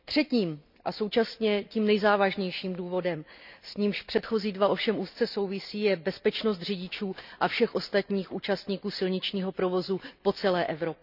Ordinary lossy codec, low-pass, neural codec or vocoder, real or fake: none; 5.4 kHz; none; real